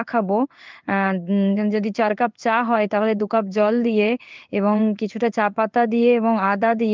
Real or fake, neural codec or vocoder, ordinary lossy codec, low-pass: fake; codec, 16 kHz in and 24 kHz out, 1 kbps, XY-Tokenizer; Opus, 24 kbps; 7.2 kHz